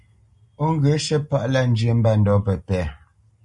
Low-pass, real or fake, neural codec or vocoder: 10.8 kHz; real; none